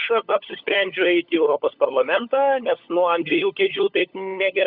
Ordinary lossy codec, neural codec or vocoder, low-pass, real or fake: Opus, 64 kbps; codec, 16 kHz, 16 kbps, FunCodec, trained on LibriTTS, 50 frames a second; 5.4 kHz; fake